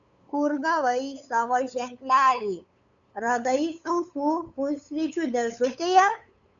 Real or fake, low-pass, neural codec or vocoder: fake; 7.2 kHz; codec, 16 kHz, 8 kbps, FunCodec, trained on LibriTTS, 25 frames a second